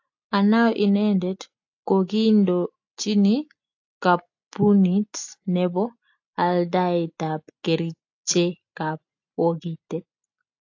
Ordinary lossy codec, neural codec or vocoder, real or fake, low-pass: AAC, 48 kbps; none; real; 7.2 kHz